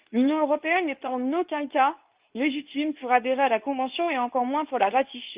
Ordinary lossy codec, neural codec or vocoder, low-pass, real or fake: Opus, 32 kbps; codec, 24 kHz, 0.9 kbps, WavTokenizer, medium speech release version 2; 3.6 kHz; fake